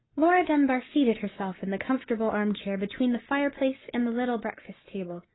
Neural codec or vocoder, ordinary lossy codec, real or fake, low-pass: codec, 44.1 kHz, 7.8 kbps, Pupu-Codec; AAC, 16 kbps; fake; 7.2 kHz